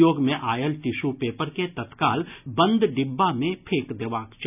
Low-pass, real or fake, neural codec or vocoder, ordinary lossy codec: 3.6 kHz; real; none; none